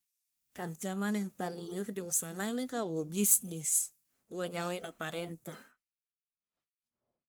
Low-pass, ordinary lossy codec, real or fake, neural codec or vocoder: none; none; fake; codec, 44.1 kHz, 1.7 kbps, Pupu-Codec